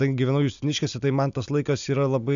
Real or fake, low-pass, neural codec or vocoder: real; 7.2 kHz; none